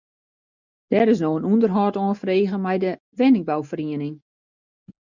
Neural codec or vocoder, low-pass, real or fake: none; 7.2 kHz; real